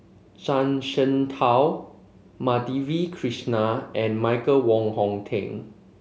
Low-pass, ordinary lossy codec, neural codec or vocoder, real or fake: none; none; none; real